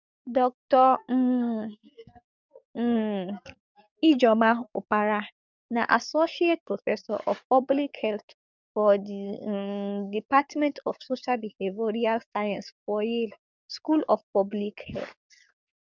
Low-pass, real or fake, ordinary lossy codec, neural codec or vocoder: 7.2 kHz; fake; none; codec, 44.1 kHz, 7.8 kbps, DAC